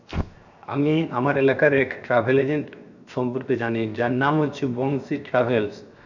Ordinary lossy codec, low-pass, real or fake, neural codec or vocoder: none; 7.2 kHz; fake; codec, 16 kHz, 0.7 kbps, FocalCodec